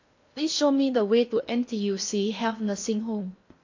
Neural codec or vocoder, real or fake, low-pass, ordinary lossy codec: codec, 16 kHz in and 24 kHz out, 0.8 kbps, FocalCodec, streaming, 65536 codes; fake; 7.2 kHz; AAC, 48 kbps